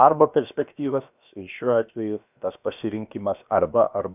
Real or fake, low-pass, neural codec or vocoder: fake; 3.6 kHz; codec, 16 kHz, about 1 kbps, DyCAST, with the encoder's durations